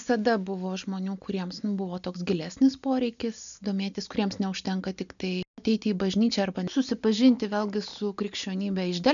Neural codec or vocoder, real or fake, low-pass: none; real; 7.2 kHz